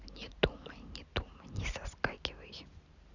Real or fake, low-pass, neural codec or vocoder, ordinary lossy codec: real; 7.2 kHz; none; none